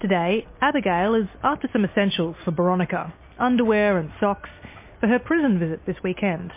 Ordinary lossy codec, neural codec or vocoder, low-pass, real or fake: MP3, 24 kbps; none; 3.6 kHz; real